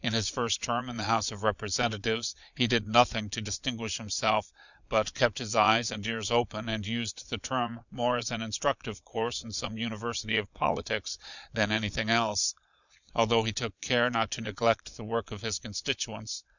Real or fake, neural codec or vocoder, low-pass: fake; vocoder, 22.05 kHz, 80 mel bands, Vocos; 7.2 kHz